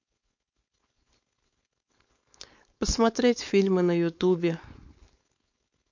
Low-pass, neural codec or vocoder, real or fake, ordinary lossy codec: 7.2 kHz; codec, 16 kHz, 4.8 kbps, FACodec; fake; MP3, 48 kbps